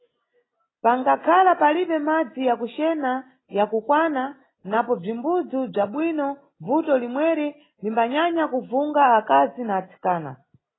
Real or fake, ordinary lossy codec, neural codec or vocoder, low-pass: real; AAC, 16 kbps; none; 7.2 kHz